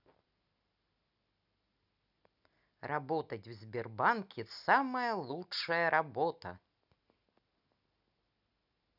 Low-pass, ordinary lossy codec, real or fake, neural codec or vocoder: 5.4 kHz; none; real; none